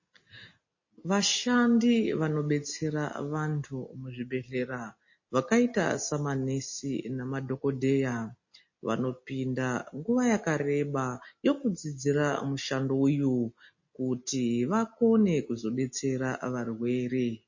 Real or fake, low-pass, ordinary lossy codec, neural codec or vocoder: real; 7.2 kHz; MP3, 32 kbps; none